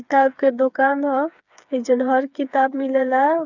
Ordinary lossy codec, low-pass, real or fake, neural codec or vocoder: none; 7.2 kHz; fake; codec, 16 kHz, 4 kbps, FreqCodec, smaller model